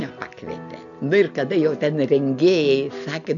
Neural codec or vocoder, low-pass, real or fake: none; 7.2 kHz; real